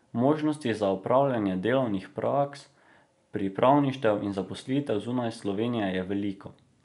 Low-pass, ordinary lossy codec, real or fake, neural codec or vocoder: 10.8 kHz; none; real; none